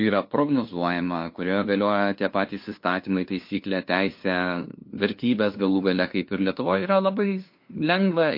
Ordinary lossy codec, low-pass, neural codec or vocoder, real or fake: MP3, 32 kbps; 5.4 kHz; codec, 16 kHz, 2 kbps, FunCodec, trained on LibriTTS, 25 frames a second; fake